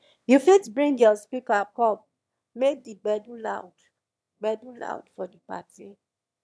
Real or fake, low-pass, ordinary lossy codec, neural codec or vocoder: fake; none; none; autoencoder, 22.05 kHz, a latent of 192 numbers a frame, VITS, trained on one speaker